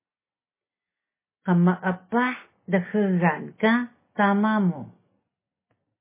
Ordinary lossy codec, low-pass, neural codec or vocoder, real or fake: MP3, 16 kbps; 3.6 kHz; none; real